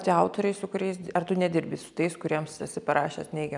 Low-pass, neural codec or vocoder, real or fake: 10.8 kHz; none; real